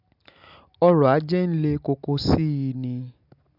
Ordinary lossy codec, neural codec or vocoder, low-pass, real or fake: none; none; 5.4 kHz; real